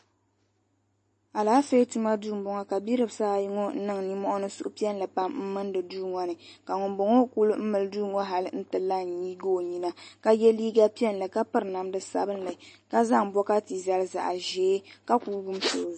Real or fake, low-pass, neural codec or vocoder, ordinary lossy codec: real; 10.8 kHz; none; MP3, 32 kbps